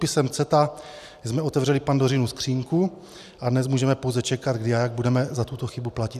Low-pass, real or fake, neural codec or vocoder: 14.4 kHz; real; none